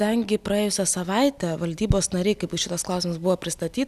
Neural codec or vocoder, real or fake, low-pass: none; real; 14.4 kHz